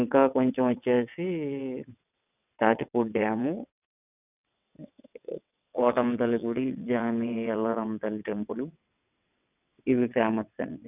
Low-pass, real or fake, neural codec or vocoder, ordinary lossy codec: 3.6 kHz; fake; vocoder, 22.05 kHz, 80 mel bands, WaveNeXt; none